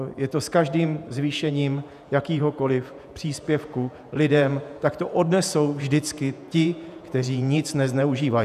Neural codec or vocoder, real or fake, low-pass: vocoder, 48 kHz, 128 mel bands, Vocos; fake; 14.4 kHz